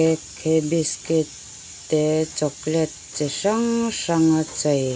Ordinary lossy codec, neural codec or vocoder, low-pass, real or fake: none; none; none; real